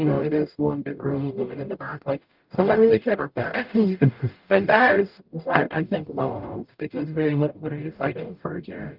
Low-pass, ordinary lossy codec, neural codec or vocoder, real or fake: 5.4 kHz; Opus, 24 kbps; codec, 44.1 kHz, 0.9 kbps, DAC; fake